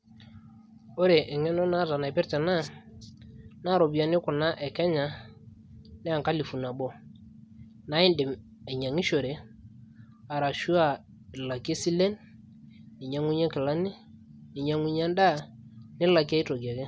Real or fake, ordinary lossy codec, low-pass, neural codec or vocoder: real; none; none; none